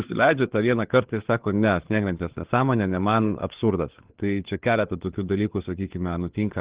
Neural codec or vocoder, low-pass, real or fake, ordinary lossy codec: codec, 16 kHz, 4 kbps, FunCodec, trained on LibriTTS, 50 frames a second; 3.6 kHz; fake; Opus, 16 kbps